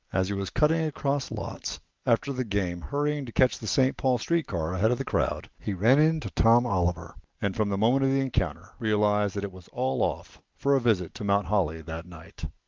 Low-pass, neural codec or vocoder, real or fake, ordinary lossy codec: 7.2 kHz; none; real; Opus, 32 kbps